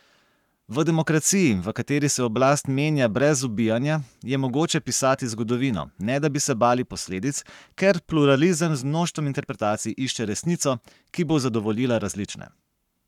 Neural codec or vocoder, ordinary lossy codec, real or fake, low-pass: codec, 44.1 kHz, 7.8 kbps, Pupu-Codec; none; fake; 19.8 kHz